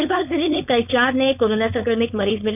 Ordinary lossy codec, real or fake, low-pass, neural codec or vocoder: none; fake; 3.6 kHz; codec, 16 kHz, 4.8 kbps, FACodec